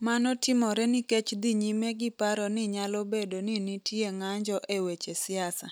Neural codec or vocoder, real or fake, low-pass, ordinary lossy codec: none; real; none; none